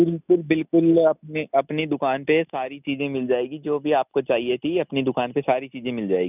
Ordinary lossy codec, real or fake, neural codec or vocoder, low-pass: none; real; none; 3.6 kHz